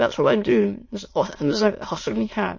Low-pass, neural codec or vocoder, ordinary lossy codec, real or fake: 7.2 kHz; autoencoder, 22.05 kHz, a latent of 192 numbers a frame, VITS, trained on many speakers; MP3, 32 kbps; fake